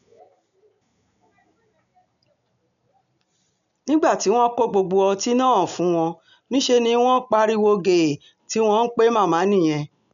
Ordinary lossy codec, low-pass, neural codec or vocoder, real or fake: none; 7.2 kHz; none; real